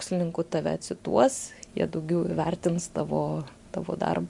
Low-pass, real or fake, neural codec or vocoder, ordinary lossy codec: 10.8 kHz; real; none; MP3, 64 kbps